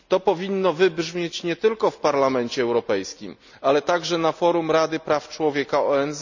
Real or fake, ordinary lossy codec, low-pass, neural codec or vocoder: real; none; 7.2 kHz; none